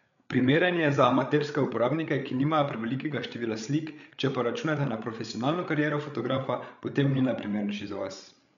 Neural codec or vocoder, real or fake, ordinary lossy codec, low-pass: codec, 16 kHz, 8 kbps, FreqCodec, larger model; fake; none; 7.2 kHz